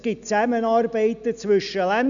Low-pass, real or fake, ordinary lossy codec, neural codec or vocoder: 7.2 kHz; real; none; none